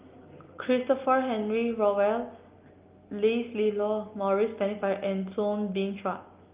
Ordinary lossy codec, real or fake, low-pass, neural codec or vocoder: Opus, 32 kbps; real; 3.6 kHz; none